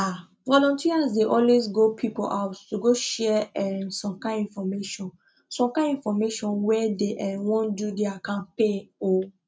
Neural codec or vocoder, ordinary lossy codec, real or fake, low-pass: none; none; real; none